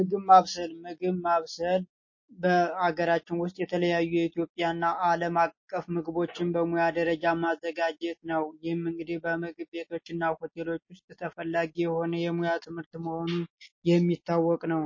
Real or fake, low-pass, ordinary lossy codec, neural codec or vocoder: real; 7.2 kHz; MP3, 32 kbps; none